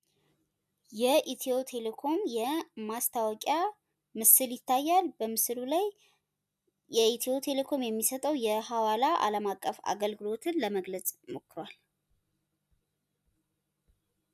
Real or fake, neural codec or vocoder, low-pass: real; none; 14.4 kHz